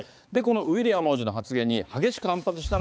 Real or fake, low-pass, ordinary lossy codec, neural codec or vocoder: fake; none; none; codec, 16 kHz, 4 kbps, X-Codec, HuBERT features, trained on balanced general audio